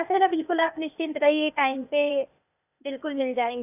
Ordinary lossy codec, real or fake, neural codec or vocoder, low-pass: none; fake; codec, 16 kHz, 0.8 kbps, ZipCodec; 3.6 kHz